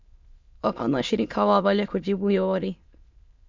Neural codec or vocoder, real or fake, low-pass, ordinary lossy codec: autoencoder, 22.05 kHz, a latent of 192 numbers a frame, VITS, trained on many speakers; fake; 7.2 kHz; MP3, 64 kbps